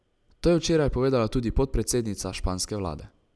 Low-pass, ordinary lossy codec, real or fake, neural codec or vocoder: none; none; real; none